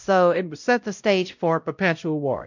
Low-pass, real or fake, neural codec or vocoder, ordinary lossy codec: 7.2 kHz; fake; codec, 16 kHz, 0.5 kbps, X-Codec, WavLM features, trained on Multilingual LibriSpeech; MP3, 64 kbps